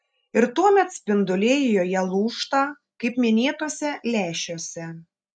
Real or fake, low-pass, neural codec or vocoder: real; 9.9 kHz; none